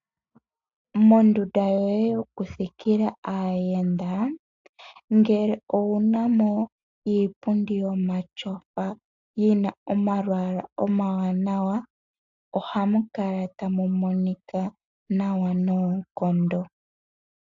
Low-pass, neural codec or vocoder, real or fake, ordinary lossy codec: 7.2 kHz; none; real; AAC, 64 kbps